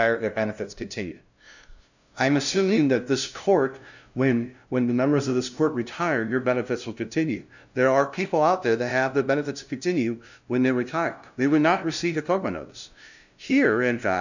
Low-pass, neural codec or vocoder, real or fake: 7.2 kHz; codec, 16 kHz, 0.5 kbps, FunCodec, trained on LibriTTS, 25 frames a second; fake